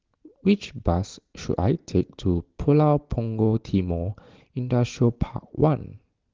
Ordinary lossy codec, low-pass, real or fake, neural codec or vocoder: Opus, 16 kbps; 7.2 kHz; real; none